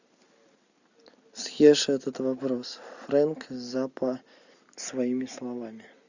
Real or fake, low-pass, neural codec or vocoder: real; 7.2 kHz; none